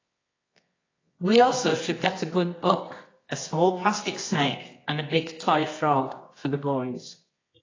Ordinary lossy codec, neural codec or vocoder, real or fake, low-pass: AAC, 32 kbps; codec, 24 kHz, 0.9 kbps, WavTokenizer, medium music audio release; fake; 7.2 kHz